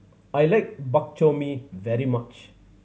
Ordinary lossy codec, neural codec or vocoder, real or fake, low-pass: none; none; real; none